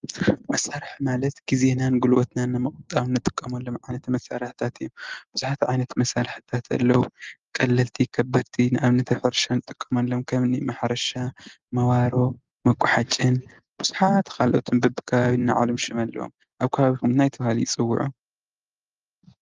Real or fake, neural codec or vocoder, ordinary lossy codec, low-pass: real; none; Opus, 32 kbps; 7.2 kHz